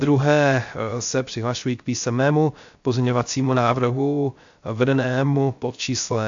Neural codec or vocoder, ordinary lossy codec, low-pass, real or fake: codec, 16 kHz, 0.3 kbps, FocalCodec; AAC, 64 kbps; 7.2 kHz; fake